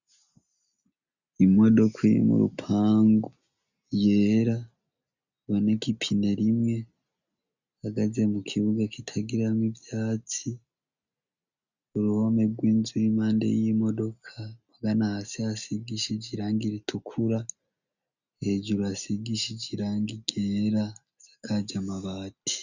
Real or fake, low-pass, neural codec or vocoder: real; 7.2 kHz; none